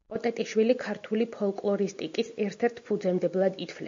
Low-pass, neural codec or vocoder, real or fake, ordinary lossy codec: 7.2 kHz; none; real; MP3, 48 kbps